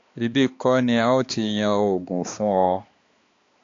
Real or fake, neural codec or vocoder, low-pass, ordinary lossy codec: fake; codec, 16 kHz, 2 kbps, X-Codec, HuBERT features, trained on balanced general audio; 7.2 kHz; AAC, 48 kbps